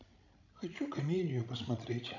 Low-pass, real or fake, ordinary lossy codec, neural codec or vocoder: 7.2 kHz; fake; none; codec, 16 kHz, 16 kbps, FreqCodec, larger model